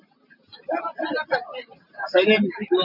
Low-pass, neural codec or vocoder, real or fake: 5.4 kHz; none; real